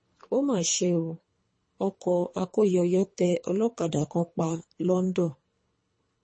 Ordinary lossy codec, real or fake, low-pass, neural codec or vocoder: MP3, 32 kbps; fake; 9.9 kHz; codec, 24 kHz, 3 kbps, HILCodec